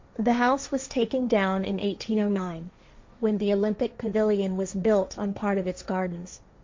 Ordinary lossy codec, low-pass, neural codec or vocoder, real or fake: MP3, 48 kbps; 7.2 kHz; codec, 16 kHz, 1.1 kbps, Voila-Tokenizer; fake